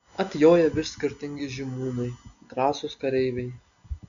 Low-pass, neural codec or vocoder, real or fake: 7.2 kHz; none; real